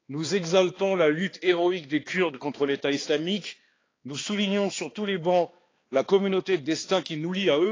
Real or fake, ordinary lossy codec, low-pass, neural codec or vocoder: fake; AAC, 32 kbps; 7.2 kHz; codec, 16 kHz, 2 kbps, X-Codec, HuBERT features, trained on balanced general audio